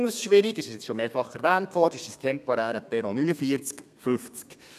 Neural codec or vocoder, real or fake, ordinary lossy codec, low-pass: codec, 32 kHz, 1.9 kbps, SNAC; fake; none; 14.4 kHz